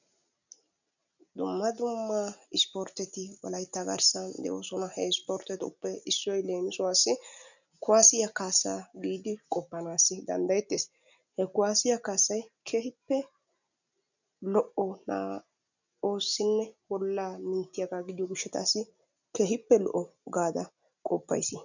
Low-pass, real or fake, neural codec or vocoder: 7.2 kHz; real; none